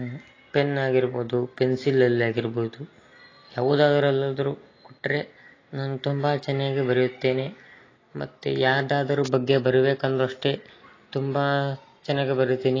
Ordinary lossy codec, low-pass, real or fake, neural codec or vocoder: AAC, 32 kbps; 7.2 kHz; real; none